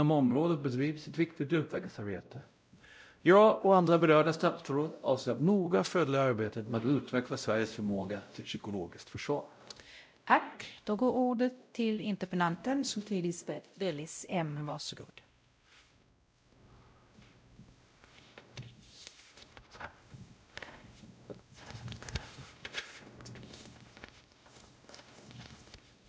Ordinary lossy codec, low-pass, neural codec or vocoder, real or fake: none; none; codec, 16 kHz, 0.5 kbps, X-Codec, WavLM features, trained on Multilingual LibriSpeech; fake